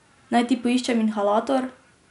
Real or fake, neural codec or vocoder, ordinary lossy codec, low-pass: real; none; none; 10.8 kHz